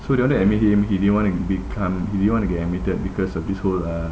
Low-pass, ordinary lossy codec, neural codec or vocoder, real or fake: none; none; none; real